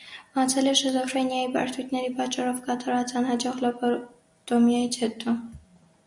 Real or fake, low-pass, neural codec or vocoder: real; 10.8 kHz; none